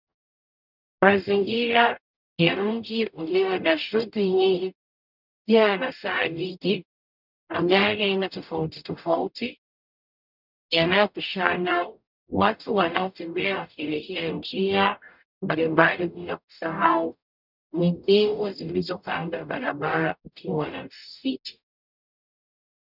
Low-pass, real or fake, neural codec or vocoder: 5.4 kHz; fake; codec, 44.1 kHz, 0.9 kbps, DAC